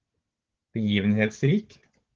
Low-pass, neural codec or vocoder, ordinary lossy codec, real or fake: 7.2 kHz; codec, 16 kHz, 4 kbps, FunCodec, trained on Chinese and English, 50 frames a second; Opus, 16 kbps; fake